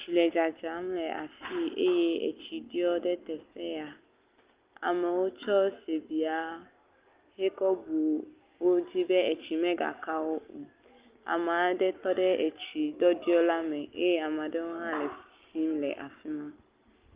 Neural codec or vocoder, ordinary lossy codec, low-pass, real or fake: none; Opus, 32 kbps; 3.6 kHz; real